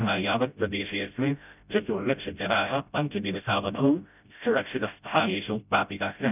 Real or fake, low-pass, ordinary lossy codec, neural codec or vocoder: fake; 3.6 kHz; none; codec, 16 kHz, 0.5 kbps, FreqCodec, smaller model